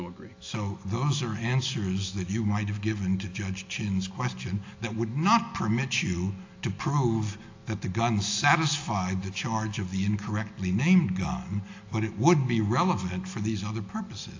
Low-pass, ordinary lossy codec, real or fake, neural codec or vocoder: 7.2 kHz; AAC, 48 kbps; real; none